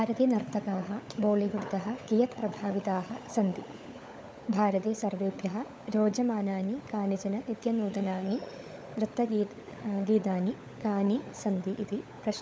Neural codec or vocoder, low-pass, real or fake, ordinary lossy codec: codec, 16 kHz, 16 kbps, FunCodec, trained on LibriTTS, 50 frames a second; none; fake; none